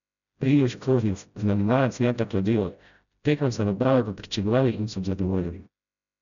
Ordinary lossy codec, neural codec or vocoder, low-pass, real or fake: none; codec, 16 kHz, 0.5 kbps, FreqCodec, smaller model; 7.2 kHz; fake